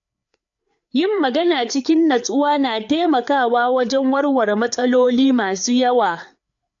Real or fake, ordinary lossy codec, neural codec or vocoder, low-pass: fake; AAC, 64 kbps; codec, 16 kHz, 4 kbps, FreqCodec, larger model; 7.2 kHz